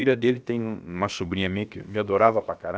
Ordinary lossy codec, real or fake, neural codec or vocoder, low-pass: none; fake; codec, 16 kHz, about 1 kbps, DyCAST, with the encoder's durations; none